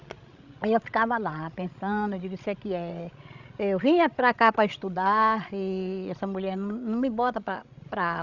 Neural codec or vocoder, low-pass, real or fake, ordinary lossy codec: codec, 16 kHz, 16 kbps, FreqCodec, larger model; 7.2 kHz; fake; none